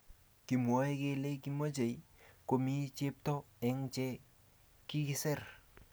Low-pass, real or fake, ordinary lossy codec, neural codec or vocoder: none; real; none; none